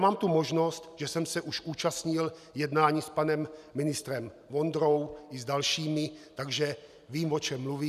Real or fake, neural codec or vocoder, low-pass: real; none; 14.4 kHz